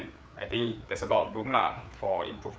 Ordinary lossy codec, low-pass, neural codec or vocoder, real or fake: none; none; codec, 16 kHz, 4 kbps, FreqCodec, larger model; fake